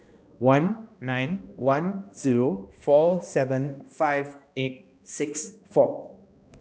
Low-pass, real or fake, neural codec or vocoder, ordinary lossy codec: none; fake; codec, 16 kHz, 1 kbps, X-Codec, HuBERT features, trained on balanced general audio; none